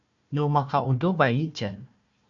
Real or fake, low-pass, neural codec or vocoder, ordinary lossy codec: fake; 7.2 kHz; codec, 16 kHz, 1 kbps, FunCodec, trained on Chinese and English, 50 frames a second; MP3, 96 kbps